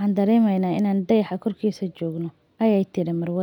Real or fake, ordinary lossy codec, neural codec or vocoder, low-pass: real; none; none; 19.8 kHz